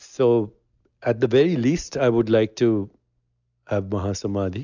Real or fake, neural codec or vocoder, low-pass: real; none; 7.2 kHz